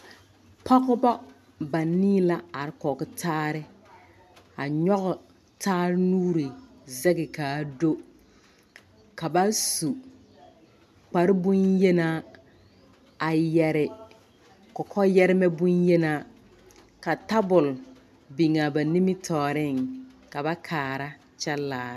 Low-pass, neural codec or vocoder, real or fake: 14.4 kHz; none; real